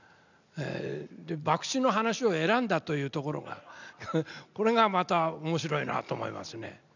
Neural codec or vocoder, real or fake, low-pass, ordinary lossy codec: none; real; 7.2 kHz; none